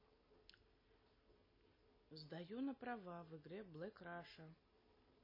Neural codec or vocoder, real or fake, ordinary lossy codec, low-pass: none; real; MP3, 24 kbps; 5.4 kHz